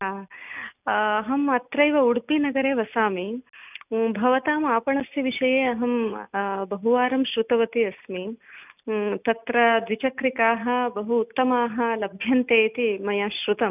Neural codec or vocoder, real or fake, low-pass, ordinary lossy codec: none; real; 3.6 kHz; none